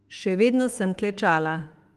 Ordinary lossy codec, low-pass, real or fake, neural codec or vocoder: Opus, 24 kbps; 14.4 kHz; fake; autoencoder, 48 kHz, 32 numbers a frame, DAC-VAE, trained on Japanese speech